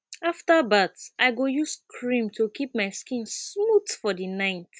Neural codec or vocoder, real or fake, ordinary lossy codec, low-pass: none; real; none; none